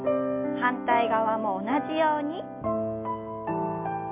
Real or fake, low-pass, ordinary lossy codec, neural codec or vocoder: real; 3.6 kHz; MP3, 32 kbps; none